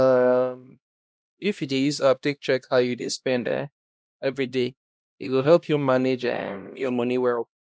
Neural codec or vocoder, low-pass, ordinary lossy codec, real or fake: codec, 16 kHz, 1 kbps, X-Codec, HuBERT features, trained on LibriSpeech; none; none; fake